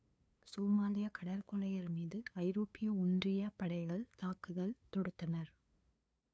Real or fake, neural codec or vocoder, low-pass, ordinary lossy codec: fake; codec, 16 kHz, 2 kbps, FunCodec, trained on LibriTTS, 25 frames a second; none; none